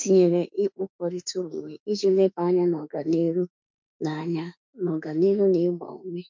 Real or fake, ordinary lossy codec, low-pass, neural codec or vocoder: fake; MP3, 48 kbps; 7.2 kHz; autoencoder, 48 kHz, 32 numbers a frame, DAC-VAE, trained on Japanese speech